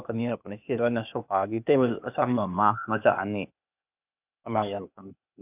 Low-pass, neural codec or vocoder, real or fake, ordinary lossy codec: 3.6 kHz; codec, 16 kHz, 0.8 kbps, ZipCodec; fake; none